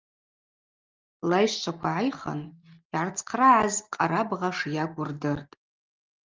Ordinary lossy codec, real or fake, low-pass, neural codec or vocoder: Opus, 24 kbps; real; 7.2 kHz; none